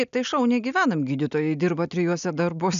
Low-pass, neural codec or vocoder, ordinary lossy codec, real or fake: 7.2 kHz; none; MP3, 96 kbps; real